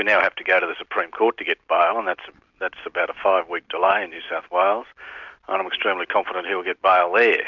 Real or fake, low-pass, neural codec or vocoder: real; 7.2 kHz; none